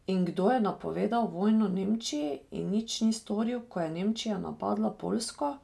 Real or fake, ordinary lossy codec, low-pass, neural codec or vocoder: real; none; none; none